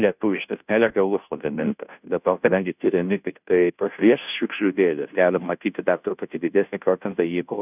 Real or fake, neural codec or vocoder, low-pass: fake; codec, 16 kHz, 0.5 kbps, FunCodec, trained on Chinese and English, 25 frames a second; 3.6 kHz